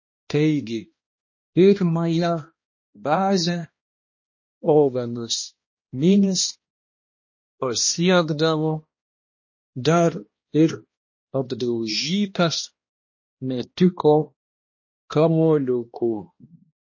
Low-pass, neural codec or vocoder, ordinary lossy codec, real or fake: 7.2 kHz; codec, 16 kHz, 1 kbps, X-Codec, HuBERT features, trained on balanced general audio; MP3, 32 kbps; fake